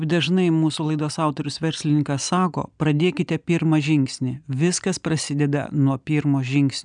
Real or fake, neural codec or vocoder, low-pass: real; none; 9.9 kHz